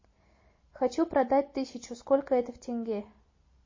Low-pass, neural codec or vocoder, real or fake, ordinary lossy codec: 7.2 kHz; none; real; MP3, 32 kbps